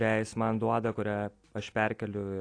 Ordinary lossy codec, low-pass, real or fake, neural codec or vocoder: AAC, 48 kbps; 9.9 kHz; real; none